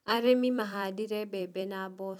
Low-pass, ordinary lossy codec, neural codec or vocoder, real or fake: 19.8 kHz; none; vocoder, 44.1 kHz, 128 mel bands every 256 samples, BigVGAN v2; fake